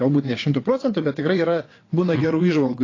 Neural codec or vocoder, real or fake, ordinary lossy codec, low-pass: vocoder, 22.05 kHz, 80 mel bands, WaveNeXt; fake; AAC, 32 kbps; 7.2 kHz